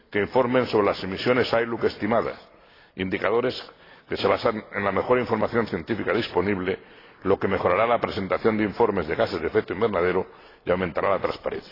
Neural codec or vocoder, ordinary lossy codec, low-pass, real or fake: none; AAC, 24 kbps; 5.4 kHz; real